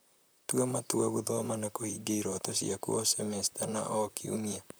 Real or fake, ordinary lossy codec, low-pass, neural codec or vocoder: fake; none; none; vocoder, 44.1 kHz, 128 mel bands, Pupu-Vocoder